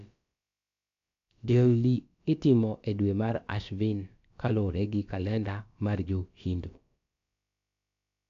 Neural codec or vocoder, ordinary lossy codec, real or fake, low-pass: codec, 16 kHz, about 1 kbps, DyCAST, with the encoder's durations; AAC, 64 kbps; fake; 7.2 kHz